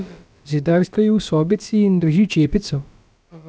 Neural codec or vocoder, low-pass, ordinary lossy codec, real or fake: codec, 16 kHz, about 1 kbps, DyCAST, with the encoder's durations; none; none; fake